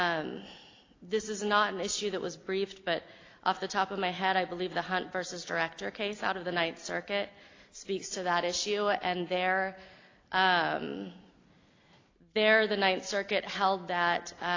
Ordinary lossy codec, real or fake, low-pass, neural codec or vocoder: AAC, 32 kbps; real; 7.2 kHz; none